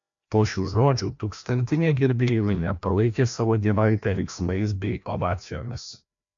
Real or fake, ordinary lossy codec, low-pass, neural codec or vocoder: fake; MP3, 64 kbps; 7.2 kHz; codec, 16 kHz, 1 kbps, FreqCodec, larger model